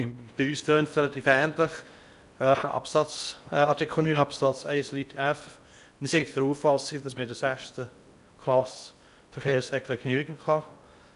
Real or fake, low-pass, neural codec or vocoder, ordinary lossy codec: fake; 10.8 kHz; codec, 16 kHz in and 24 kHz out, 0.6 kbps, FocalCodec, streaming, 4096 codes; none